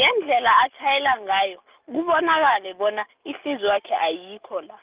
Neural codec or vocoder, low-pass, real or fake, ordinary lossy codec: none; 3.6 kHz; real; Opus, 32 kbps